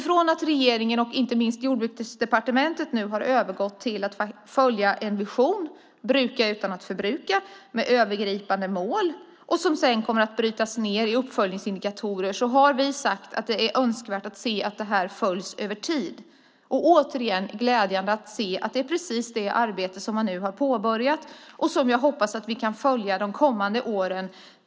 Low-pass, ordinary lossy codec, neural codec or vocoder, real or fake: none; none; none; real